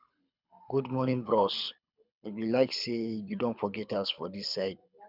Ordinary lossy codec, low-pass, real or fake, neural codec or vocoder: none; 5.4 kHz; fake; codec, 24 kHz, 6 kbps, HILCodec